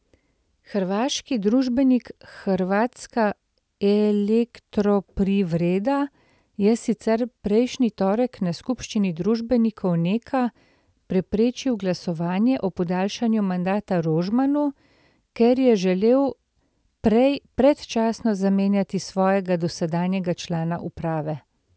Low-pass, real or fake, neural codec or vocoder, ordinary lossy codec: none; real; none; none